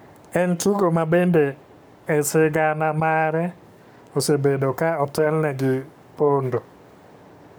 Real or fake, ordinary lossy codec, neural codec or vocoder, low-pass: fake; none; codec, 44.1 kHz, 7.8 kbps, Pupu-Codec; none